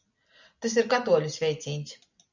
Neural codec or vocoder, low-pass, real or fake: none; 7.2 kHz; real